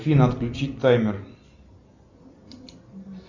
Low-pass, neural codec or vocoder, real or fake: 7.2 kHz; none; real